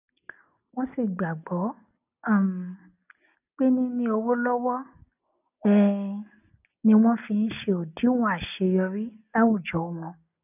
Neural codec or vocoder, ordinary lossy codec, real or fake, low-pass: none; none; real; 3.6 kHz